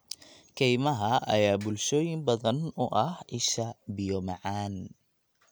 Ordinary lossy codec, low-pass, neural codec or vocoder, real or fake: none; none; none; real